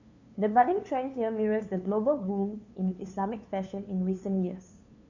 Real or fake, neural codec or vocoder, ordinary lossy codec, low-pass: fake; codec, 16 kHz, 2 kbps, FunCodec, trained on LibriTTS, 25 frames a second; none; 7.2 kHz